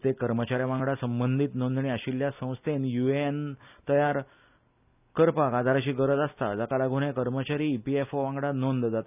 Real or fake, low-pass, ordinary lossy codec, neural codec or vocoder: real; 3.6 kHz; none; none